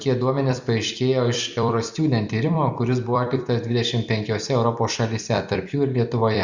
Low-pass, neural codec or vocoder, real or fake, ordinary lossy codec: 7.2 kHz; vocoder, 44.1 kHz, 128 mel bands every 256 samples, BigVGAN v2; fake; Opus, 64 kbps